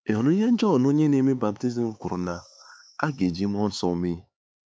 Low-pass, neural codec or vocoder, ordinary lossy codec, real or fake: none; codec, 16 kHz, 4 kbps, X-Codec, HuBERT features, trained on LibriSpeech; none; fake